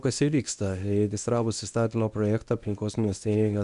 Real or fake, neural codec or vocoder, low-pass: fake; codec, 24 kHz, 0.9 kbps, WavTokenizer, medium speech release version 1; 10.8 kHz